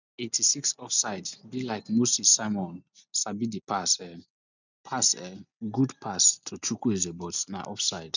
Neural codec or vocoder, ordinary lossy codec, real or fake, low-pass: none; none; real; 7.2 kHz